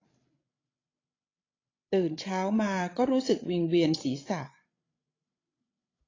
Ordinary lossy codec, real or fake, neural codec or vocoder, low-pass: AAC, 32 kbps; fake; codec, 16 kHz, 16 kbps, FreqCodec, larger model; 7.2 kHz